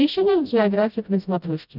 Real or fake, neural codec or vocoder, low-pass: fake; codec, 16 kHz, 0.5 kbps, FreqCodec, smaller model; 5.4 kHz